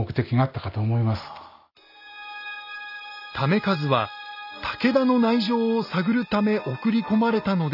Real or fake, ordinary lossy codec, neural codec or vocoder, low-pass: real; none; none; 5.4 kHz